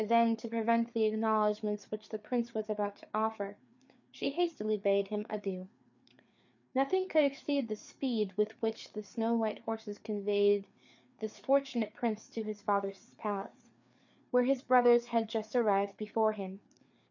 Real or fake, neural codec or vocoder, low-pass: fake; codec, 16 kHz, 4 kbps, FreqCodec, larger model; 7.2 kHz